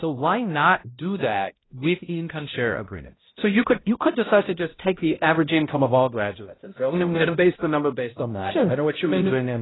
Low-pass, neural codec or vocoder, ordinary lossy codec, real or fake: 7.2 kHz; codec, 16 kHz, 0.5 kbps, X-Codec, HuBERT features, trained on balanced general audio; AAC, 16 kbps; fake